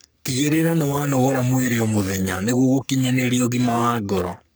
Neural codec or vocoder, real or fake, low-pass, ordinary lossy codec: codec, 44.1 kHz, 3.4 kbps, Pupu-Codec; fake; none; none